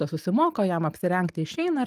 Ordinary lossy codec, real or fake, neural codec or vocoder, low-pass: Opus, 32 kbps; real; none; 14.4 kHz